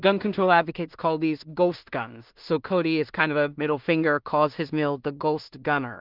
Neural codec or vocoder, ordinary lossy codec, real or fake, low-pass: codec, 16 kHz in and 24 kHz out, 0.4 kbps, LongCat-Audio-Codec, two codebook decoder; Opus, 24 kbps; fake; 5.4 kHz